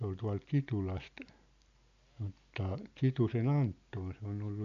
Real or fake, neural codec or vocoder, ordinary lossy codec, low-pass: real; none; MP3, 64 kbps; 7.2 kHz